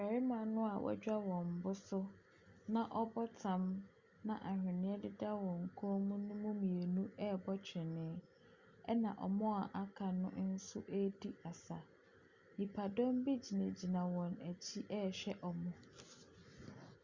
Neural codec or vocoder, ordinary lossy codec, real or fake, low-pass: none; Opus, 64 kbps; real; 7.2 kHz